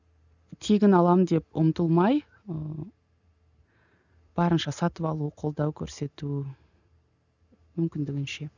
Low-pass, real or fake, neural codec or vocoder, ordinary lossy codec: 7.2 kHz; real; none; none